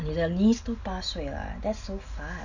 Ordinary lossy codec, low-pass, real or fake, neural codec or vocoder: none; 7.2 kHz; real; none